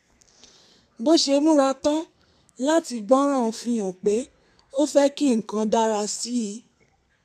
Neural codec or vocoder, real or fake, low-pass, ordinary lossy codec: codec, 32 kHz, 1.9 kbps, SNAC; fake; 14.4 kHz; none